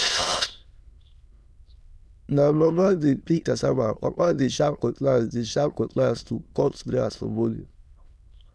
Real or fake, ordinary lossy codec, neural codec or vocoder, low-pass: fake; none; autoencoder, 22.05 kHz, a latent of 192 numbers a frame, VITS, trained on many speakers; none